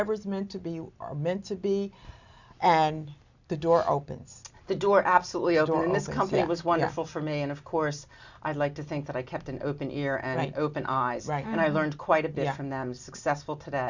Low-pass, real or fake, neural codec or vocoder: 7.2 kHz; real; none